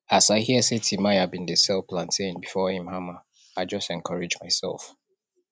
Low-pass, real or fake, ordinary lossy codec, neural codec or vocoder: none; real; none; none